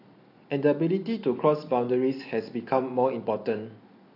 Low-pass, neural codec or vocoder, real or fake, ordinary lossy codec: 5.4 kHz; none; real; AAC, 32 kbps